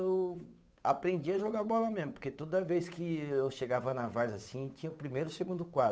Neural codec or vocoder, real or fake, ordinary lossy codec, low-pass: codec, 16 kHz, 8 kbps, FunCodec, trained on Chinese and English, 25 frames a second; fake; none; none